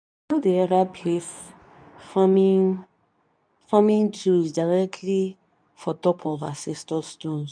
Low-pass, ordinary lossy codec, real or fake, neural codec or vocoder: 9.9 kHz; none; fake; codec, 24 kHz, 0.9 kbps, WavTokenizer, medium speech release version 2